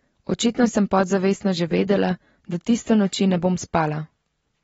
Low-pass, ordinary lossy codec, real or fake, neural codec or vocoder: 14.4 kHz; AAC, 24 kbps; real; none